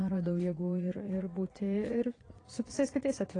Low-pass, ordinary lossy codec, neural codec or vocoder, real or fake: 9.9 kHz; AAC, 32 kbps; vocoder, 22.05 kHz, 80 mel bands, Vocos; fake